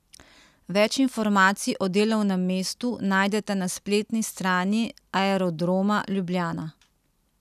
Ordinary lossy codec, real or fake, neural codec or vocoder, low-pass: none; real; none; 14.4 kHz